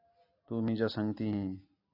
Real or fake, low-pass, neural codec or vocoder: real; 5.4 kHz; none